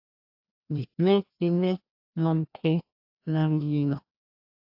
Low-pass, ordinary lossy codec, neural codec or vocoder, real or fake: 5.4 kHz; AAC, 48 kbps; codec, 16 kHz, 1 kbps, FreqCodec, larger model; fake